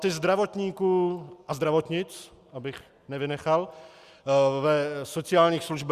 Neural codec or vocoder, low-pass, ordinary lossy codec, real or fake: none; 14.4 kHz; Opus, 64 kbps; real